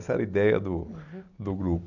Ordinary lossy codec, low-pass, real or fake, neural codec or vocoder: none; 7.2 kHz; real; none